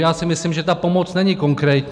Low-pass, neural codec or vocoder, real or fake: 9.9 kHz; none; real